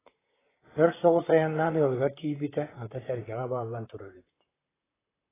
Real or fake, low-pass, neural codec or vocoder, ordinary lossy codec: fake; 3.6 kHz; vocoder, 44.1 kHz, 128 mel bands, Pupu-Vocoder; AAC, 16 kbps